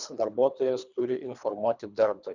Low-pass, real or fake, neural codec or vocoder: 7.2 kHz; fake; codec, 24 kHz, 3 kbps, HILCodec